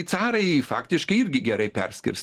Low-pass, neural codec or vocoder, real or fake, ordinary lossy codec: 14.4 kHz; none; real; Opus, 16 kbps